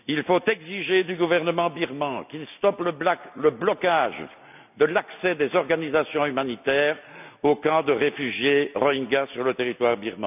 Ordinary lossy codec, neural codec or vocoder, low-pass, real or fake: none; none; 3.6 kHz; real